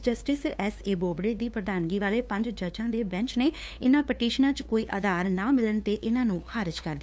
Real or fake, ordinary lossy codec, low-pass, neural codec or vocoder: fake; none; none; codec, 16 kHz, 2 kbps, FunCodec, trained on LibriTTS, 25 frames a second